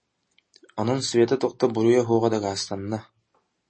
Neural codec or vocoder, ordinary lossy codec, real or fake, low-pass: none; MP3, 32 kbps; real; 9.9 kHz